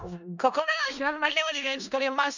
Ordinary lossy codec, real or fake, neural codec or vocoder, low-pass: none; fake; codec, 16 kHz in and 24 kHz out, 0.4 kbps, LongCat-Audio-Codec, four codebook decoder; 7.2 kHz